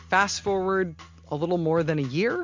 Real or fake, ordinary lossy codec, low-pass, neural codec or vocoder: real; MP3, 64 kbps; 7.2 kHz; none